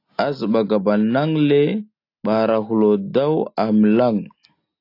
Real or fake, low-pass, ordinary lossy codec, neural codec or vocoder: real; 5.4 kHz; AAC, 32 kbps; none